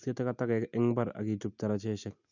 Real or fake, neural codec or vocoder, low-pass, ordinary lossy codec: real; none; 7.2 kHz; none